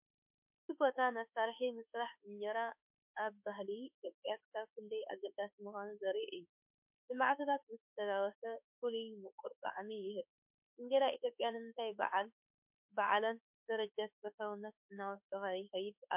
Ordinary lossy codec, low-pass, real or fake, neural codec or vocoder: MP3, 32 kbps; 3.6 kHz; fake; autoencoder, 48 kHz, 32 numbers a frame, DAC-VAE, trained on Japanese speech